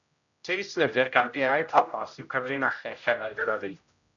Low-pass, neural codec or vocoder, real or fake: 7.2 kHz; codec, 16 kHz, 0.5 kbps, X-Codec, HuBERT features, trained on general audio; fake